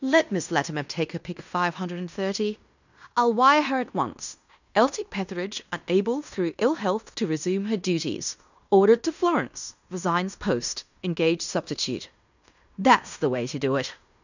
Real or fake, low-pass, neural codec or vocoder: fake; 7.2 kHz; codec, 16 kHz in and 24 kHz out, 0.9 kbps, LongCat-Audio-Codec, fine tuned four codebook decoder